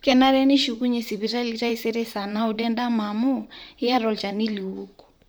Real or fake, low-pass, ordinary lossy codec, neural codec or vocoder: fake; none; none; vocoder, 44.1 kHz, 128 mel bands, Pupu-Vocoder